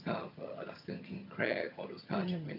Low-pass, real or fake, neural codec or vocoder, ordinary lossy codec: 5.4 kHz; fake; vocoder, 22.05 kHz, 80 mel bands, HiFi-GAN; MP3, 48 kbps